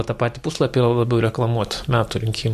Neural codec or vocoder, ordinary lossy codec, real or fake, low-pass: none; MP3, 64 kbps; real; 14.4 kHz